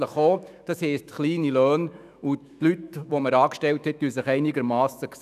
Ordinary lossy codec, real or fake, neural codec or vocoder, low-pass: none; fake; autoencoder, 48 kHz, 128 numbers a frame, DAC-VAE, trained on Japanese speech; 14.4 kHz